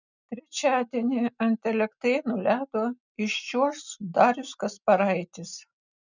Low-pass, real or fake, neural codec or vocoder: 7.2 kHz; real; none